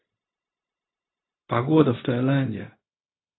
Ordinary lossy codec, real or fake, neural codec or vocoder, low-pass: AAC, 16 kbps; fake; codec, 16 kHz, 0.4 kbps, LongCat-Audio-Codec; 7.2 kHz